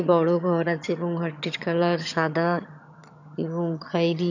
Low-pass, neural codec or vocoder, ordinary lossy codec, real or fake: 7.2 kHz; vocoder, 22.05 kHz, 80 mel bands, HiFi-GAN; none; fake